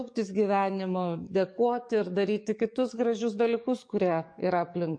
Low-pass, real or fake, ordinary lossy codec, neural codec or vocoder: 9.9 kHz; fake; MP3, 48 kbps; codec, 44.1 kHz, 7.8 kbps, DAC